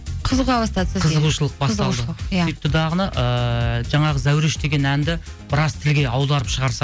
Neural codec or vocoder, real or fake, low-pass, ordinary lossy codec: none; real; none; none